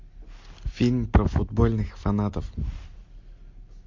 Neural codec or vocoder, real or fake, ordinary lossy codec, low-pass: none; real; MP3, 48 kbps; 7.2 kHz